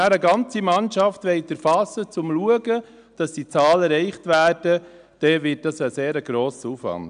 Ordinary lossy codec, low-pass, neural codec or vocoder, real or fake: none; 9.9 kHz; none; real